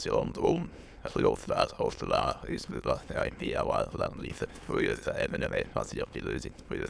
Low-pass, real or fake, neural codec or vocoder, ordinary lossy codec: none; fake; autoencoder, 22.05 kHz, a latent of 192 numbers a frame, VITS, trained on many speakers; none